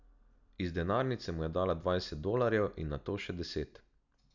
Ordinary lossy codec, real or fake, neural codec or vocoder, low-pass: none; real; none; 7.2 kHz